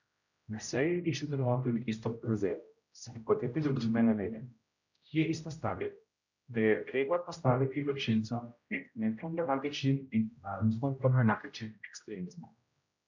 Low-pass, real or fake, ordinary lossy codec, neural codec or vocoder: 7.2 kHz; fake; none; codec, 16 kHz, 0.5 kbps, X-Codec, HuBERT features, trained on general audio